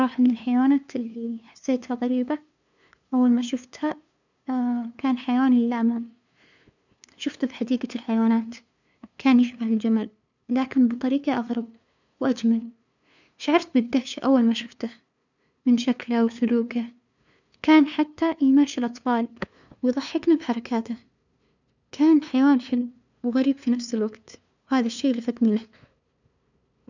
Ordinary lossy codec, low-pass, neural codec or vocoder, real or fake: none; 7.2 kHz; codec, 16 kHz, 2 kbps, FunCodec, trained on LibriTTS, 25 frames a second; fake